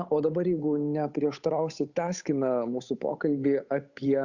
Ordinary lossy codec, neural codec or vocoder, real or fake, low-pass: Opus, 64 kbps; codec, 16 kHz, 8 kbps, FunCodec, trained on Chinese and English, 25 frames a second; fake; 7.2 kHz